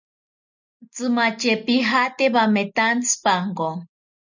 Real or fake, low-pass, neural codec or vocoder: real; 7.2 kHz; none